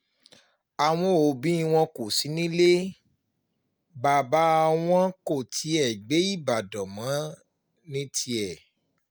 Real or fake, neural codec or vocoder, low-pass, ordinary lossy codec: real; none; none; none